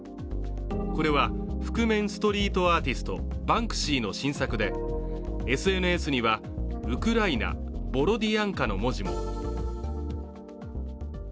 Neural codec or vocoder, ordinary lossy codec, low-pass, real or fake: none; none; none; real